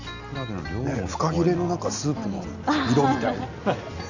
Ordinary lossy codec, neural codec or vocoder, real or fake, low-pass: none; none; real; 7.2 kHz